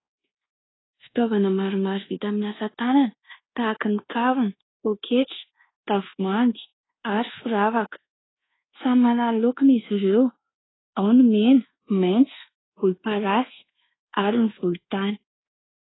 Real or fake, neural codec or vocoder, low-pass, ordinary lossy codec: fake; codec, 24 kHz, 1.2 kbps, DualCodec; 7.2 kHz; AAC, 16 kbps